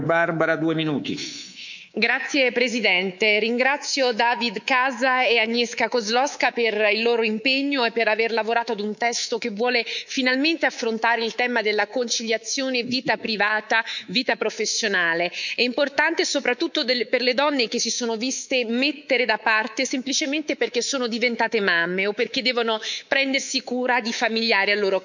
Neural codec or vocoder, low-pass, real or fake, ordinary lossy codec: codec, 24 kHz, 3.1 kbps, DualCodec; 7.2 kHz; fake; none